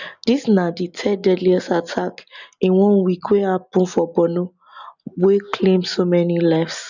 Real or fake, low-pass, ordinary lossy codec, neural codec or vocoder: real; 7.2 kHz; none; none